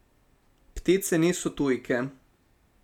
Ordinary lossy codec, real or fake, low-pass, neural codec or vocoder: none; real; 19.8 kHz; none